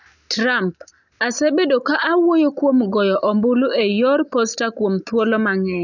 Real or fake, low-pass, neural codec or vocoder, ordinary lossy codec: real; 7.2 kHz; none; none